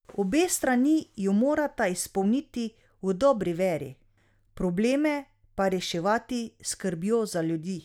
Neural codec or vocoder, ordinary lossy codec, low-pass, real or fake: none; none; 19.8 kHz; real